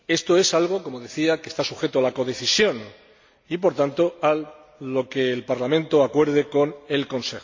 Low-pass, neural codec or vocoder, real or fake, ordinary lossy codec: 7.2 kHz; none; real; MP3, 48 kbps